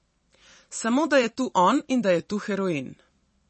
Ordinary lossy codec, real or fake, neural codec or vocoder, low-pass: MP3, 32 kbps; fake; vocoder, 44.1 kHz, 128 mel bands every 256 samples, BigVGAN v2; 10.8 kHz